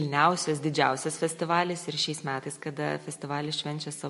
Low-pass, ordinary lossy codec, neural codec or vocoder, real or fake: 14.4 kHz; MP3, 48 kbps; none; real